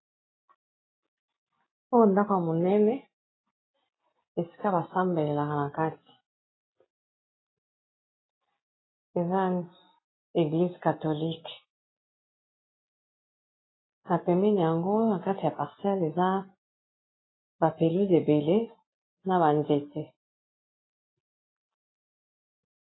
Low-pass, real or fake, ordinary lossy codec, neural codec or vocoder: 7.2 kHz; real; AAC, 16 kbps; none